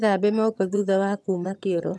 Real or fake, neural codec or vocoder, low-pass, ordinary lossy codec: fake; vocoder, 22.05 kHz, 80 mel bands, HiFi-GAN; none; none